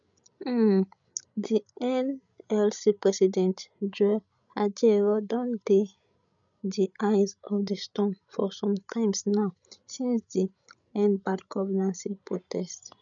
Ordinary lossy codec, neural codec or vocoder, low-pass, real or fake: none; codec, 16 kHz, 16 kbps, FreqCodec, larger model; 7.2 kHz; fake